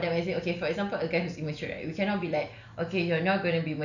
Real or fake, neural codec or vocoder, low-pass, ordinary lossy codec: real; none; 7.2 kHz; none